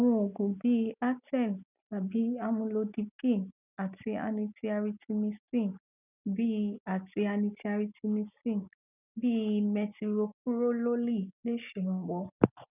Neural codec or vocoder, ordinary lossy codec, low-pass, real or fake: none; none; 3.6 kHz; real